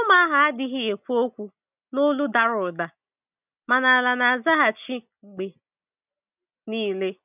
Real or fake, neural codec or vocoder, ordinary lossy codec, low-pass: real; none; none; 3.6 kHz